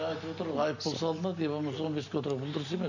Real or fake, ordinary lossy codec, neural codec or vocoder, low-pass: real; none; none; 7.2 kHz